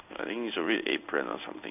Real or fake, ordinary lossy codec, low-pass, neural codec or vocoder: fake; none; 3.6 kHz; codec, 16 kHz in and 24 kHz out, 1 kbps, XY-Tokenizer